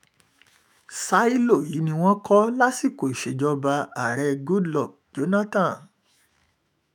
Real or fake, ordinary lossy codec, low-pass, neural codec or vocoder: fake; none; none; autoencoder, 48 kHz, 128 numbers a frame, DAC-VAE, trained on Japanese speech